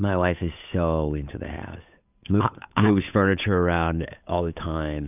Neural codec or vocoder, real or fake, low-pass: codec, 16 kHz, 8 kbps, FunCodec, trained on Chinese and English, 25 frames a second; fake; 3.6 kHz